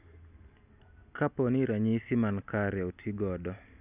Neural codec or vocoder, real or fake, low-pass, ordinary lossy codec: none; real; 3.6 kHz; none